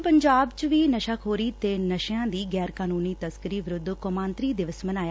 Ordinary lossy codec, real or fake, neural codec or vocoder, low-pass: none; real; none; none